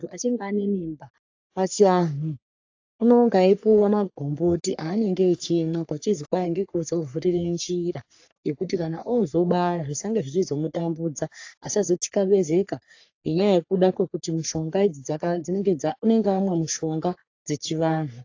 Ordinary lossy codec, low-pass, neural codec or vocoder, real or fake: AAC, 48 kbps; 7.2 kHz; codec, 44.1 kHz, 3.4 kbps, Pupu-Codec; fake